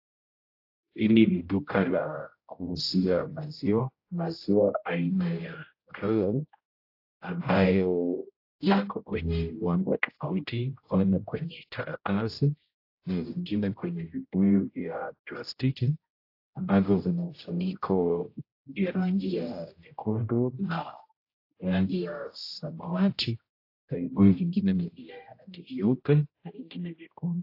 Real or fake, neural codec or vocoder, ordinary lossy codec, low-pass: fake; codec, 16 kHz, 0.5 kbps, X-Codec, HuBERT features, trained on general audio; AAC, 32 kbps; 5.4 kHz